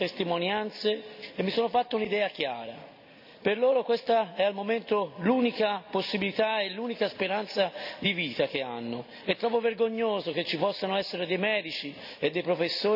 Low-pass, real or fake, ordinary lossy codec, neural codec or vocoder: 5.4 kHz; real; none; none